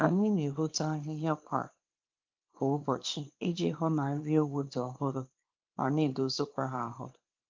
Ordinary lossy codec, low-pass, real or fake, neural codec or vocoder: Opus, 24 kbps; 7.2 kHz; fake; codec, 24 kHz, 0.9 kbps, WavTokenizer, small release